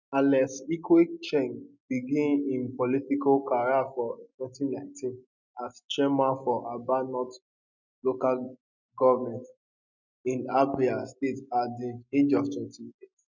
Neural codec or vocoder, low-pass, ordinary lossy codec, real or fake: none; 7.2 kHz; none; real